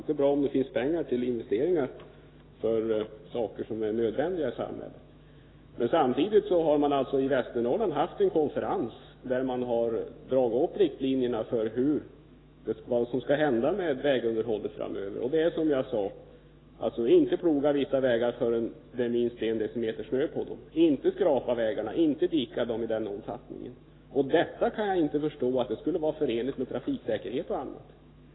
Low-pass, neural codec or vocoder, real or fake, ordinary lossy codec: 7.2 kHz; autoencoder, 48 kHz, 128 numbers a frame, DAC-VAE, trained on Japanese speech; fake; AAC, 16 kbps